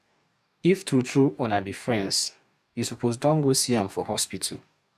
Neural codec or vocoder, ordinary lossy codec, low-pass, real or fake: codec, 44.1 kHz, 2.6 kbps, DAC; none; 14.4 kHz; fake